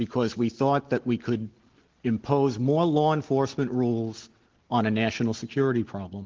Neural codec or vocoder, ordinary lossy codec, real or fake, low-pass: none; Opus, 16 kbps; real; 7.2 kHz